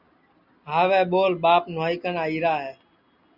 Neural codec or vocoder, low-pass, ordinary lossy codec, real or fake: none; 5.4 kHz; Opus, 64 kbps; real